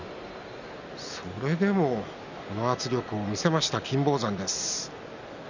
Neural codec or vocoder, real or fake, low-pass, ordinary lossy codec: none; real; 7.2 kHz; none